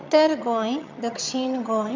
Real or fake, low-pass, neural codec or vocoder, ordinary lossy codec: fake; 7.2 kHz; vocoder, 22.05 kHz, 80 mel bands, HiFi-GAN; MP3, 64 kbps